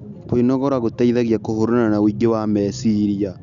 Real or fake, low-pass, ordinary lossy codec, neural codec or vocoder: real; 7.2 kHz; none; none